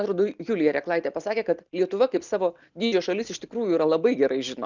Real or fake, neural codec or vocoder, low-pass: real; none; 7.2 kHz